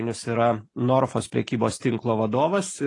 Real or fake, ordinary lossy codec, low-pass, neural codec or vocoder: real; AAC, 32 kbps; 10.8 kHz; none